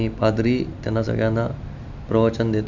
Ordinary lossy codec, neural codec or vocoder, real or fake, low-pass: none; none; real; 7.2 kHz